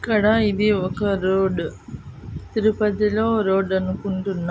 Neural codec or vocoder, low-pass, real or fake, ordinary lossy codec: none; none; real; none